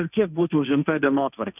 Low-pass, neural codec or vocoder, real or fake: 3.6 kHz; codec, 16 kHz, 1.1 kbps, Voila-Tokenizer; fake